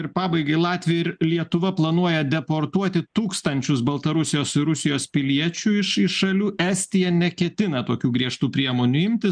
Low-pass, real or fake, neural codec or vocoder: 9.9 kHz; real; none